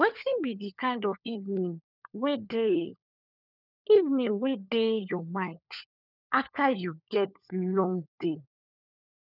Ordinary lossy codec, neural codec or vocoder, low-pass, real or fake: none; codec, 16 kHz, 4 kbps, FunCodec, trained on LibriTTS, 50 frames a second; 5.4 kHz; fake